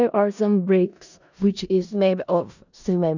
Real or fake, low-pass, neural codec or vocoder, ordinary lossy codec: fake; 7.2 kHz; codec, 16 kHz in and 24 kHz out, 0.4 kbps, LongCat-Audio-Codec, four codebook decoder; none